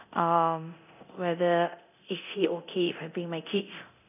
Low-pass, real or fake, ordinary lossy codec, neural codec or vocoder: 3.6 kHz; fake; none; codec, 24 kHz, 0.9 kbps, DualCodec